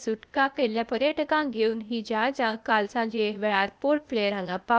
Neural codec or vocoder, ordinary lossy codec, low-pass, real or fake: codec, 16 kHz, 0.8 kbps, ZipCodec; none; none; fake